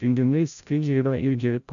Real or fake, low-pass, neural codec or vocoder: fake; 7.2 kHz; codec, 16 kHz, 0.5 kbps, FreqCodec, larger model